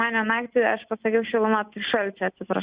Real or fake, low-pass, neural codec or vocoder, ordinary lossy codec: real; 3.6 kHz; none; Opus, 64 kbps